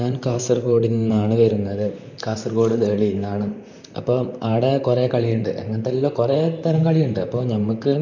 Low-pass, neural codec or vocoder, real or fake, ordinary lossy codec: 7.2 kHz; vocoder, 44.1 kHz, 128 mel bands, Pupu-Vocoder; fake; none